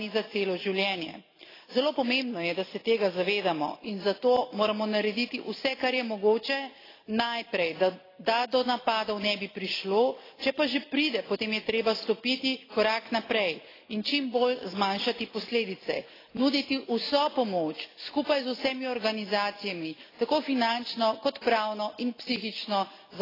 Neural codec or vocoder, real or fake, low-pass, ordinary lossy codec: none; real; 5.4 kHz; AAC, 24 kbps